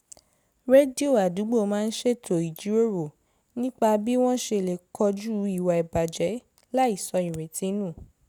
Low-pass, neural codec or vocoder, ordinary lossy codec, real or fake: none; none; none; real